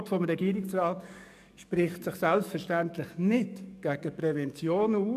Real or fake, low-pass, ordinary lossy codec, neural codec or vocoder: fake; 14.4 kHz; none; codec, 44.1 kHz, 7.8 kbps, DAC